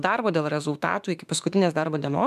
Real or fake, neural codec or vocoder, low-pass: fake; autoencoder, 48 kHz, 32 numbers a frame, DAC-VAE, trained on Japanese speech; 14.4 kHz